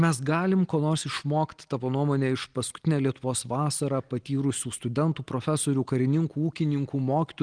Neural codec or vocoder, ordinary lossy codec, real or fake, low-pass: none; Opus, 24 kbps; real; 9.9 kHz